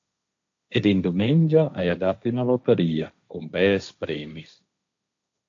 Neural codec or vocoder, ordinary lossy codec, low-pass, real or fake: codec, 16 kHz, 1.1 kbps, Voila-Tokenizer; AAC, 64 kbps; 7.2 kHz; fake